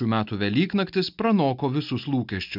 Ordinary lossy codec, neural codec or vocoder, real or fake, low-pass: AAC, 48 kbps; none; real; 5.4 kHz